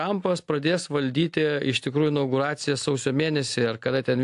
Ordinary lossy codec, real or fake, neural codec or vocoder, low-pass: AAC, 64 kbps; real; none; 10.8 kHz